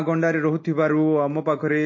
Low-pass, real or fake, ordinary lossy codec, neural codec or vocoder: 7.2 kHz; real; MP3, 32 kbps; none